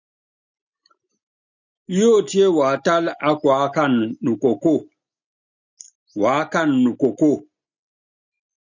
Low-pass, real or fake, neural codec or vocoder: 7.2 kHz; real; none